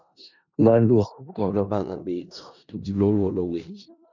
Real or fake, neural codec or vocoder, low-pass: fake; codec, 16 kHz in and 24 kHz out, 0.4 kbps, LongCat-Audio-Codec, four codebook decoder; 7.2 kHz